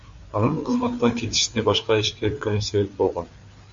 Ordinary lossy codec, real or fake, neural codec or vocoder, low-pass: MP3, 48 kbps; fake; codec, 16 kHz, 4 kbps, FreqCodec, larger model; 7.2 kHz